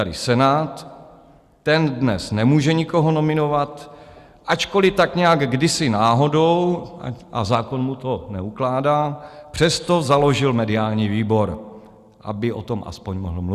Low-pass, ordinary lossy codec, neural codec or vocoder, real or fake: 14.4 kHz; Opus, 64 kbps; none; real